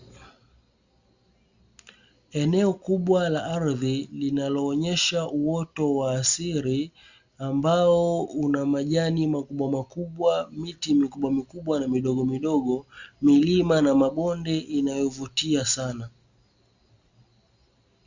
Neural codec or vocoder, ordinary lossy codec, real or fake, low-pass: none; Opus, 64 kbps; real; 7.2 kHz